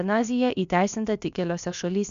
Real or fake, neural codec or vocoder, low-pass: fake; codec, 16 kHz, about 1 kbps, DyCAST, with the encoder's durations; 7.2 kHz